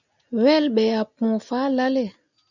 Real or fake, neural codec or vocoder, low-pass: real; none; 7.2 kHz